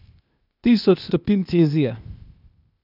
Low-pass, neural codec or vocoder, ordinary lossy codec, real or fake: 5.4 kHz; codec, 16 kHz, 0.8 kbps, ZipCodec; none; fake